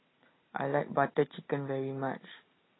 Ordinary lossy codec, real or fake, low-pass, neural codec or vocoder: AAC, 16 kbps; real; 7.2 kHz; none